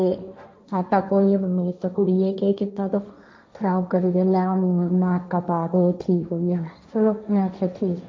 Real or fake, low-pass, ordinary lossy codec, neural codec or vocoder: fake; none; none; codec, 16 kHz, 1.1 kbps, Voila-Tokenizer